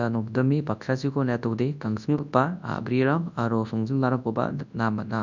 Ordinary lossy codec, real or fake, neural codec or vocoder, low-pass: none; fake; codec, 24 kHz, 0.9 kbps, WavTokenizer, large speech release; 7.2 kHz